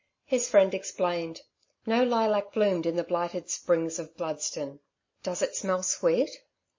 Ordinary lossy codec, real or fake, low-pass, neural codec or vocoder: MP3, 32 kbps; real; 7.2 kHz; none